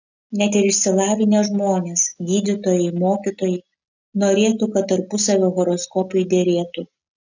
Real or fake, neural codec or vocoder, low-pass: real; none; 7.2 kHz